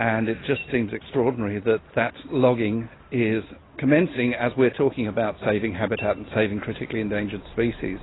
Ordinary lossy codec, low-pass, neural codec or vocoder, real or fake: AAC, 16 kbps; 7.2 kHz; none; real